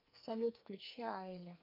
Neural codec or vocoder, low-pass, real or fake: codec, 44.1 kHz, 2.6 kbps, SNAC; 5.4 kHz; fake